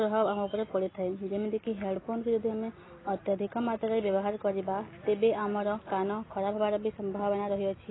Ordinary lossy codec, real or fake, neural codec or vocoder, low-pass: AAC, 16 kbps; real; none; 7.2 kHz